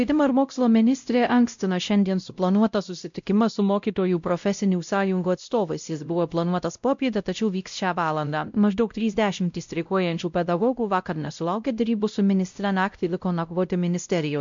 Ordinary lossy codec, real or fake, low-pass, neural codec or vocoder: MP3, 64 kbps; fake; 7.2 kHz; codec, 16 kHz, 0.5 kbps, X-Codec, WavLM features, trained on Multilingual LibriSpeech